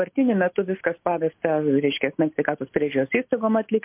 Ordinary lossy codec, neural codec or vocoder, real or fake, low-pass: MP3, 32 kbps; none; real; 3.6 kHz